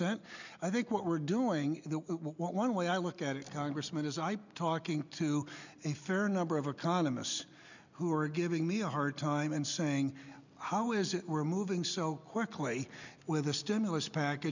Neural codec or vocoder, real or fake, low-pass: none; real; 7.2 kHz